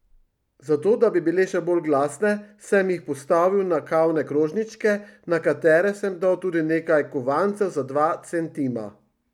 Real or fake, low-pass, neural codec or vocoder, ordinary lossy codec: real; 19.8 kHz; none; none